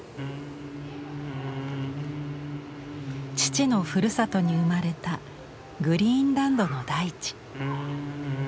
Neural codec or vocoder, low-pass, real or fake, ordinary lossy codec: none; none; real; none